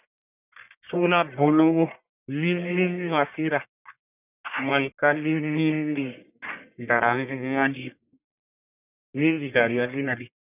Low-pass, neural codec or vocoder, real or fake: 3.6 kHz; codec, 44.1 kHz, 1.7 kbps, Pupu-Codec; fake